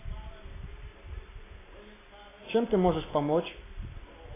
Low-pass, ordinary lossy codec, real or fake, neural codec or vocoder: 3.6 kHz; AAC, 16 kbps; real; none